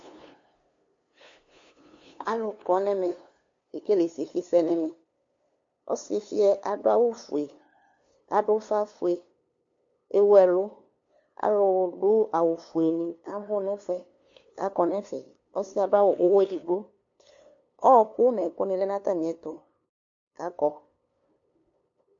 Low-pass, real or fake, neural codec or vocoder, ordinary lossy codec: 7.2 kHz; fake; codec, 16 kHz, 2 kbps, FunCodec, trained on LibriTTS, 25 frames a second; MP3, 48 kbps